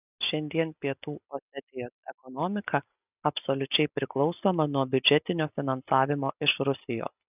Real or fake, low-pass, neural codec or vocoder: real; 3.6 kHz; none